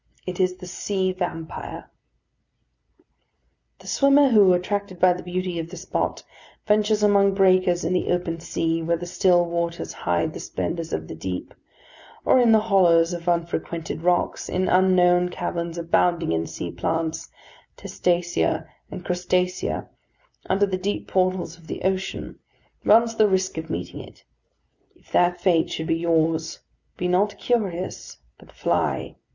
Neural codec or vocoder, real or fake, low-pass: none; real; 7.2 kHz